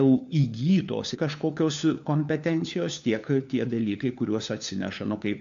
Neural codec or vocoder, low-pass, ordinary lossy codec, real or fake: codec, 16 kHz, 4 kbps, FunCodec, trained on LibriTTS, 50 frames a second; 7.2 kHz; AAC, 64 kbps; fake